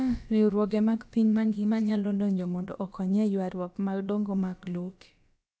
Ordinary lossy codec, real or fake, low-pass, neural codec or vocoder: none; fake; none; codec, 16 kHz, about 1 kbps, DyCAST, with the encoder's durations